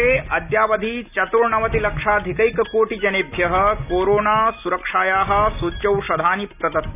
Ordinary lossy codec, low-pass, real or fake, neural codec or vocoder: none; 3.6 kHz; real; none